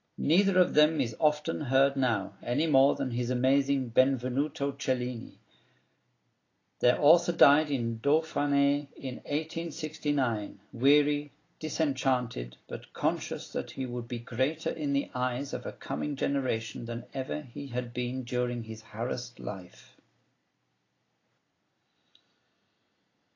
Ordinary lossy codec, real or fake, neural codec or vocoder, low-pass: AAC, 32 kbps; real; none; 7.2 kHz